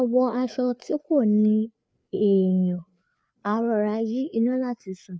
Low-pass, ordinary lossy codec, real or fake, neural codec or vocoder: none; none; fake; codec, 16 kHz, 4 kbps, FreqCodec, larger model